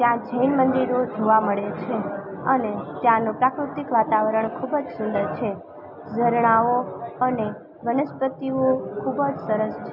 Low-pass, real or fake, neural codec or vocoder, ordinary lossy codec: 5.4 kHz; real; none; none